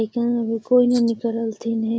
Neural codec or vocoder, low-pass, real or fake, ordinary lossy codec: none; none; real; none